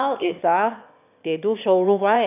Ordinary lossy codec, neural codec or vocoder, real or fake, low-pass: AAC, 32 kbps; autoencoder, 22.05 kHz, a latent of 192 numbers a frame, VITS, trained on one speaker; fake; 3.6 kHz